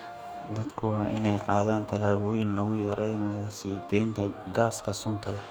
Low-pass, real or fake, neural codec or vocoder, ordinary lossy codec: none; fake; codec, 44.1 kHz, 2.6 kbps, DAC; none